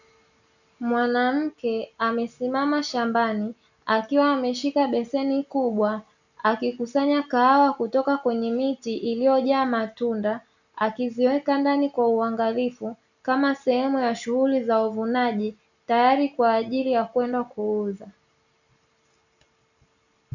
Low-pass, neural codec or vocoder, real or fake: 7.2 kHz; none; real